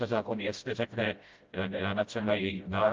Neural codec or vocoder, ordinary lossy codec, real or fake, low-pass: codec, 16 kHz, 0.5 kbps, FreqCodec, smaller model; Opus, 24 kbps; fake; 7.2 kHz